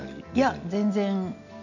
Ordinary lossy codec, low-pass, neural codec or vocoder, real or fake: none; 7.2 kHz; none; real